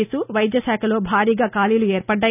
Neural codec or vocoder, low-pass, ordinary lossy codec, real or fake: none; 3.6 kHz; none; real